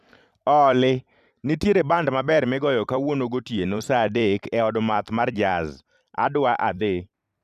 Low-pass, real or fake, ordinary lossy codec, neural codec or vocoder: 14.4 kHz; fake; none; vocoder, 44.1 kHz, 128 mel bands every 256 samples, BigVGAN v2